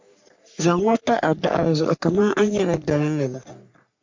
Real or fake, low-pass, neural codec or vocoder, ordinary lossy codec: fake; 7.2 kHz; codec, 44.1 kHz, 3.4 kbps, Pupu-Codec; MP3, 64 kbps